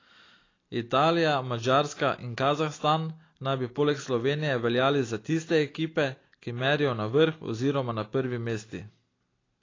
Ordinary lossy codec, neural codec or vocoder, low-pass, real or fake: AAC, 32 kbps; none; 7.2 kHz; real